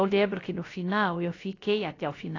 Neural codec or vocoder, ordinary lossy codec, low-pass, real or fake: codec, 16 kHz, about 1 kbps, DyCAST, with the encoder's durations; AAC, 32 kbps; 7.2 kHz; fake